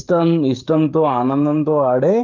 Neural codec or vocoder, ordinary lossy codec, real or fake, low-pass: codec, 16 kHz, 16 kbps, FreqCodec, smaller model; Opus, 32 kbps; fake; 7.2 kHz